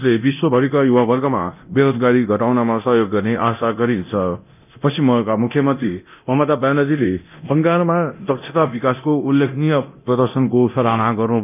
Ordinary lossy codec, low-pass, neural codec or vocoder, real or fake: none; 3.6 kHz; codec, 24 kHz, 0.9 kbps, DualCodec; fake